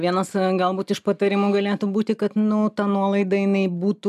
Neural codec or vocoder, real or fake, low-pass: none; real; 14.4 kHz